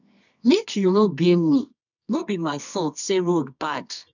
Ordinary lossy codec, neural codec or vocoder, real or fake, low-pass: none; codec, 24 kHz, 0.9 kbps, WavTokenizer, medium music audio release; fake; 7.2 kHz